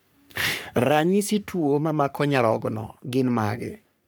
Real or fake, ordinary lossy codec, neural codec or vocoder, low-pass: fake; none; codec, 44.1 kHz, 3.4 kbps, Pupu-Codec; none